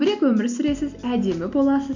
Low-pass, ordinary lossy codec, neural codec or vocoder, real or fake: 7.2 kHz; Opus, 64 kbps; none; real